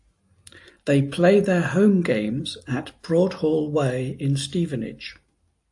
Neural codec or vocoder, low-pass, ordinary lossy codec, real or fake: none; 10.8 kHz; AAC, 64 kbps; real